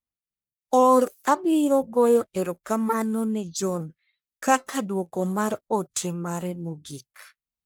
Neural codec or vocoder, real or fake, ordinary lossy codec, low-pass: codec, 44.1 kHz, 1.7 kbps, Pupu-Codec; fake; none; none